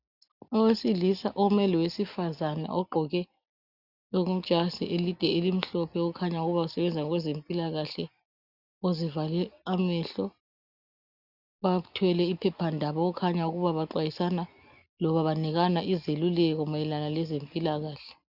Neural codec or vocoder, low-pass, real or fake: none; 5.4 kHz; real